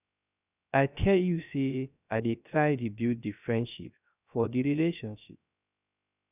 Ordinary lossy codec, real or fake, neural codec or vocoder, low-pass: none; fake; codec, 16 kHz, 0.3 kbps, FocalCodec; 3.6 kHz